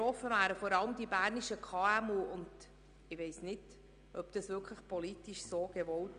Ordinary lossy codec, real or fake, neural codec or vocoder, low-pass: none; real; none; 9.9 kHz